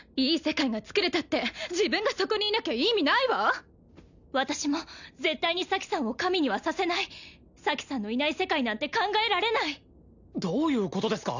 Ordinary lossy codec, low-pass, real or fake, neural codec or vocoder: none; 7.2 kHz; real; none